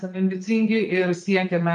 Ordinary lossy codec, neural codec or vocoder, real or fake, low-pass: MP3, 48 kbps; vocoder, 22.05 kHz, 80 mel bands, Vocos; fake; 9.9 kHz